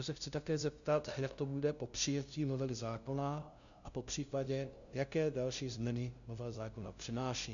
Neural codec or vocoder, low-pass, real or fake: codec, 16 kHz, 0.5 kbps, FunCodec, trained on LibriTTS, 25 frames a second; 7.2 kHz; fake